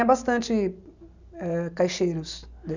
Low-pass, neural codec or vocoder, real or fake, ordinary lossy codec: 7.2 kHz; none; real; none